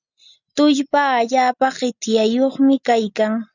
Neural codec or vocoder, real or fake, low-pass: none; real; 7.2 kHz